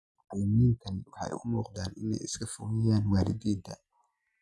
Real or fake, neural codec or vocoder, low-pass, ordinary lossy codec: real; none; none; none